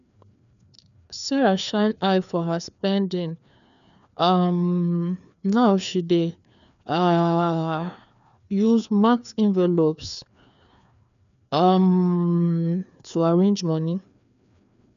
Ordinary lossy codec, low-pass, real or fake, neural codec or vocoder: none; 7.2 kHz; fake; codec, 16 kHz, 2 kbps, FreqCodec, larger model